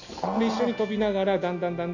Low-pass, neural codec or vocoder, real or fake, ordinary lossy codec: 7.2 kHz; none; real; none